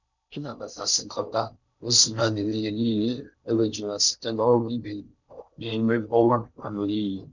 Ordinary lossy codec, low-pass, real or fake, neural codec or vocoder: none; 7.2 kHz; fake; codec, 16 kHz in and 24 kHz out, 0.6 kbps, FocalCodec, streaming, 4096 codes